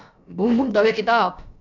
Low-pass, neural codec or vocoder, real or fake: 7.2 kHz; codec, 16 kHz, about 1 kbps, DyCAST, with the encoder's durations; fake